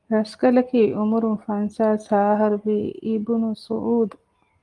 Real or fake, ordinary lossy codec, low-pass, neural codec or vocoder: real; Opus, 24 kbps; 10.8 kHz; none